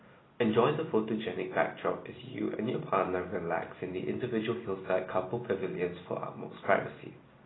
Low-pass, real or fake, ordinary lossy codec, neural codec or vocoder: 7.2 kHz; real; AAC, 16 kbps; none